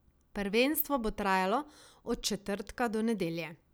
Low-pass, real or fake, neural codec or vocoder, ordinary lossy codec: none; real; none; none